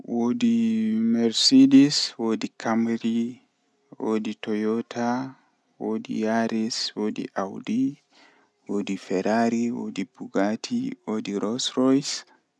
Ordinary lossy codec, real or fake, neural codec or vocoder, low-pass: none; real; none; none